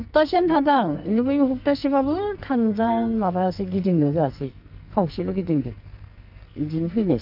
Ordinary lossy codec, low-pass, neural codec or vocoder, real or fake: none; 5.4 kHz; codec, 44.1 kHz, 2.6 kbps, SNAC; fake